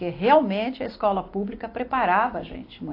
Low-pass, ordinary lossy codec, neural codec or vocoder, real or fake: 5.4 kHz; AAC, 32 kbps; none; real